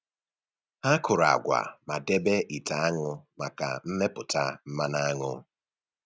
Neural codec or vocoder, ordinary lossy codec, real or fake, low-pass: none; none; real; none